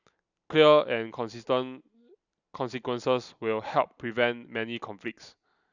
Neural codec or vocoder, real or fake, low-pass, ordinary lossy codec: none; real; 7.2 kHz; none